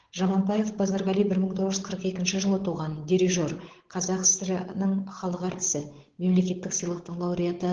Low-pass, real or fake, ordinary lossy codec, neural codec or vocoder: 7.2 kHz; fake; Opus, 16 kbps; codec, 16 kHz, 8 kbps, FunCodec, trained on Chinese and English, 25 frames a second